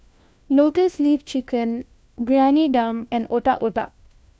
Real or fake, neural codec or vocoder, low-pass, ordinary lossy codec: fake; codec, 16 kHz, 1 kbps, FunCodec, trained on LibriTTS, 50 frames a second; none; none